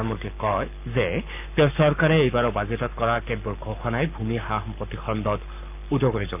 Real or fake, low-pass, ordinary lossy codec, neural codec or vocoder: fake; 3.6 kHz; none; codec, 44.1 kHz, 7.8 kbps, Pupu-Codec